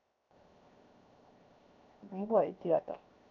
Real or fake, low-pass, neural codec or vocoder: fake; 7.2 kHz; codec, 16 kHz, 0.7 kbps, FocalCodec